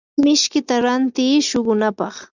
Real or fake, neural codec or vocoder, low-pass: real; none; 7.2 kHz